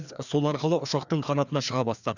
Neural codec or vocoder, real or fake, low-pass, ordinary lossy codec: codec, 16 kHz, 2 kbps, FreqCodec, larger model; fake; 7.2 kHz; none